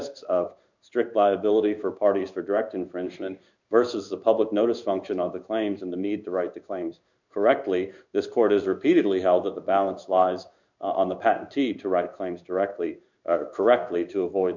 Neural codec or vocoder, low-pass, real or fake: codec, 16 kHz in and 24 kHz out, 1 kbps, XY-Tokenizer; 7.2 kHz; fake